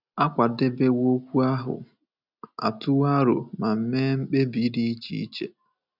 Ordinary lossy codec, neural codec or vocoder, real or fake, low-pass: none; none; real; 5.4 kHz